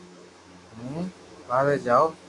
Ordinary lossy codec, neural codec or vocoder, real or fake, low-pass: AAC, 48 kbps; autoencoder, 48 kHz, 128 numbers a frame, DAC-VAE, trained on Japanese speech; fake; 10.8 kHz